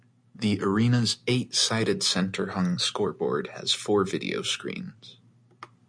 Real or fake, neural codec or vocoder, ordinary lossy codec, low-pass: real; none; MP3, 64 kbps; 9.9 kHz